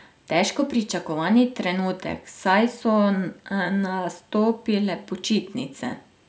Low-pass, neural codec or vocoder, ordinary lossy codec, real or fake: none; none; none; real